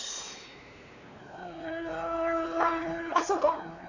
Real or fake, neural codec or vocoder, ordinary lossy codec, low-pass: fake; codec, 16 kHz, 2 kbps, X-Codec, WavLM features, trained on Multilingual LibriSpeech; none; 7.2 kHz